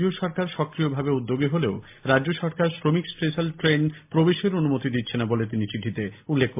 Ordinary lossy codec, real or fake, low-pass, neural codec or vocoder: MP3, 32 kbps; real; 3.6 kHz; none